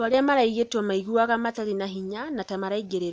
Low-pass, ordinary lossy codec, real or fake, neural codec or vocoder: none; none; real; none